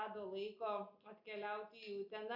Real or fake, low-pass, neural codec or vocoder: real; 5.4 kHz; none